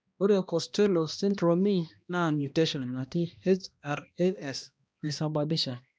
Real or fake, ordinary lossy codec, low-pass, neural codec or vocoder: fake; none; none; codec, 16 kHz, 1 kbps, X-Codec, HuBERT features, trained on balanced general audio